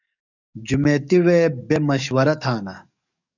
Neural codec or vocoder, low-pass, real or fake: codec, 44.1 kHz, 7.8 kbps, DAC; 7.2 kHz; fake